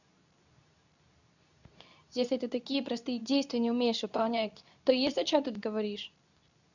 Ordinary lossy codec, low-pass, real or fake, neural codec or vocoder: none; 7.2 kHz; fake; codec, 24 kHz, 0.9 kbps, WavTokenizer, medium speech release version 2